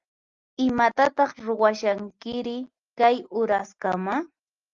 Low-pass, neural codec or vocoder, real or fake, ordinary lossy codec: 7.2 kHz; none; real; Opus, 32 kbps